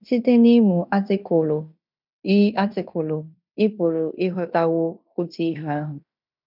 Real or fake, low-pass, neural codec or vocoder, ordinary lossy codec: fake; 5.4 kHz; codec, 16 kHz in and 24 kHz out, 0.9 kbps, LongCat-Audio-Codec, fine tuned four codebook decoder; none